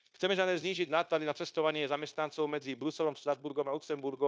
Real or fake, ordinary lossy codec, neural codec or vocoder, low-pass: fake; none; codec, 16 kHz, 0.9 kbps, LongCat-Audio-Codec; none